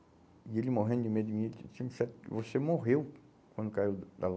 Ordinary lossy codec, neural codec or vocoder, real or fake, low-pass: none; none; real; none